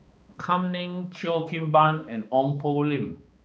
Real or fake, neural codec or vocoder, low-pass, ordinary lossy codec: fake; codec, 16 kHz, 2 kbps, X-Codec, HuBERT features, trained on balanced general audio; none; none